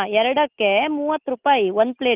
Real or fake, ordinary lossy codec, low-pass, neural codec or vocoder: real; Opus, 32 kbps; 3.6 kHz; none